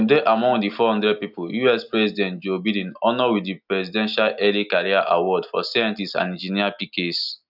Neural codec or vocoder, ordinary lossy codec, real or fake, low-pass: none; none; real; 5.4 kHz